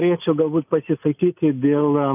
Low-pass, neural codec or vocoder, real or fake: 3.6 kHz; none; real